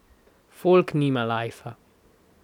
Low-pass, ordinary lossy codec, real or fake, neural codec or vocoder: 19.8 kHz; none; real; none